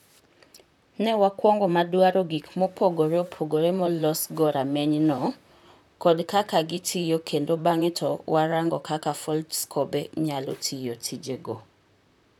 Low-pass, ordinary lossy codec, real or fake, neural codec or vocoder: 19.8 kHz; none; fake; vocoder, 44.1 kHz, 128 mel bands, Pupu-Vocoder